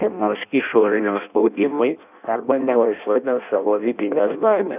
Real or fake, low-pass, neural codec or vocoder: fake; 3.6 kHz; codec, 16 kHz in and 24 kHz out, 0.6 kbps, FireRedTTS-2 codec